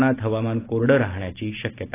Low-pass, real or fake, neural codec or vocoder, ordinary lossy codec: 3.6 kHz; real; none; AAC, 16 kbps